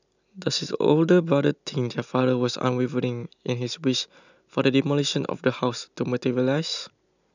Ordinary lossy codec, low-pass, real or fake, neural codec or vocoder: none; 7.2 kHz; real; none